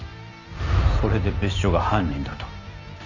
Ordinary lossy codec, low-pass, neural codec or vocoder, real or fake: none; 7.2 kHz; none; real